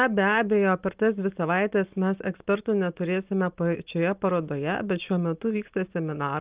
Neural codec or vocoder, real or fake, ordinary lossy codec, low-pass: none; real; Opus, 24 kbps; 3.6 kHz